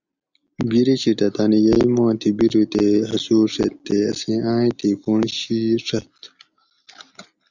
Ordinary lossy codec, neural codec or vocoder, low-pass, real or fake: Opus, 64 kbps; none; 7.2 kHz; real